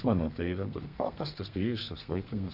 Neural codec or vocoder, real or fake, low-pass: codec, 32 kHz, 1.9 kbps, SNAC; fake; 5.4 kHz